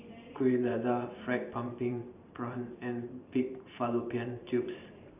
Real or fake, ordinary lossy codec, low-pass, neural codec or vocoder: fake; none; 3.6 kHz; vocoder, 44.1 kHz, 128 mel bands every 512 samples, BigVGAN v2